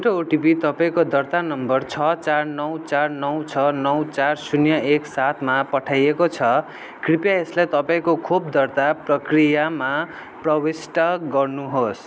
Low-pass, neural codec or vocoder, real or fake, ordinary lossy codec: none; none; real; none